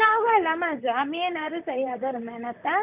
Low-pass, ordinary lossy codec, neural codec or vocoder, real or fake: 3.6 kHz; none; vocoder, 44.1 kHz, 128 mel bands, Pupu-Vocoder; fake